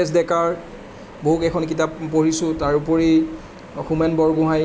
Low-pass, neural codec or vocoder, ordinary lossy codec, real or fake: none; none; none; real